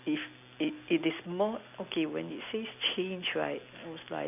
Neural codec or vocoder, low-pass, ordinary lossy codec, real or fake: none; 3.6 kHz; none; real